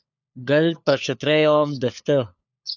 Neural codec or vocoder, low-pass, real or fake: codec, 16 kHz, 4 kbps, FunCodec, trained on LibriTTS, 50 frames a second; 7.2 kHz; fake